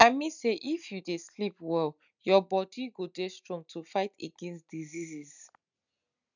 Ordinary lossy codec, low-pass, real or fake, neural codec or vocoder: none; 7.2 kHz; real; none